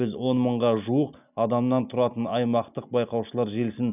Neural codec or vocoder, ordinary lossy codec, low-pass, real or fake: none; none; 3.6 kHz; real